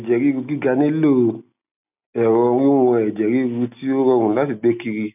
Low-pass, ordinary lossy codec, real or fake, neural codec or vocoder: 3.6 kHz; none; real; none